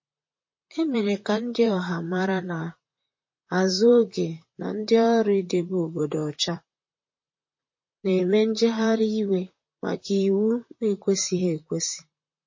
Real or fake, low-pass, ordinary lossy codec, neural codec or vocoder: fake; 7.2 kHz; MP3, 32 kbps; vocoder, 44.1 kHz, 128 mel bands, Pupu-Vocoder